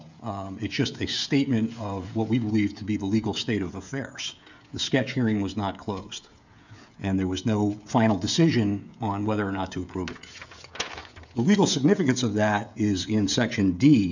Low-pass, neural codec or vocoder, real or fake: 7.2 kHz; codec, 16 kHz, 16 kbps, FreqCodec, smaller model; fake